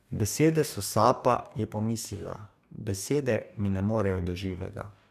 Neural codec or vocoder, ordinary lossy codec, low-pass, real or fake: codec, 44.1 kHz, 2.6 kbps, DAC; none; 14.4 kHz; fake